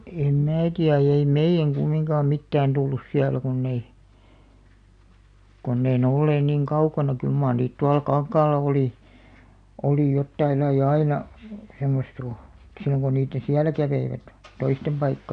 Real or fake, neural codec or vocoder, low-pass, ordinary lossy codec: real; none; 9.9 kHz; none